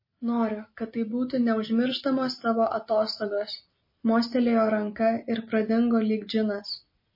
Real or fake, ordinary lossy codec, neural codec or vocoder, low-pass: real; MP3, 24 kbps; none; 5.4 kHz